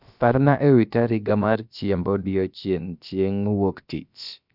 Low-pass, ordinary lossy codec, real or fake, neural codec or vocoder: 5.4 kHz; none; fake; codec, 16 kHz, about 1 kbps, DyCAST, with the encoder's durations